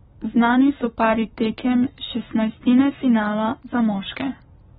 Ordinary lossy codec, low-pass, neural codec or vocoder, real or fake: AAC, 16 kbps; 19.8 kHz; codec, 44.1 kHz, 7.8 kbps, Pupu-Codec; fake